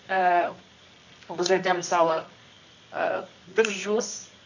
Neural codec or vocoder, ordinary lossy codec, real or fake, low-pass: codec, 24 kHz, 0.9 kbps, WavTokenizer, medium music audio release; none; fake; 7.2 kHz